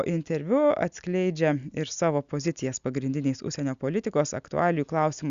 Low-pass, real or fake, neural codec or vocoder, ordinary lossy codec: 7.2 kHz; real; none; Opus, 64 kbps